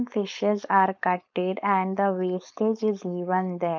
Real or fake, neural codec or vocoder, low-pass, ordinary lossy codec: fake; codec, 16 kHz, 4.8 kbps, FACodec; 7.2 kHz; none